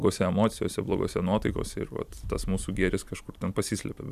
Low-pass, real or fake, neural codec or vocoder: 14.4 kHz; fake; vocoder, 44.1 kHz, 128 mel bands every 256 samples, BigVGAN v2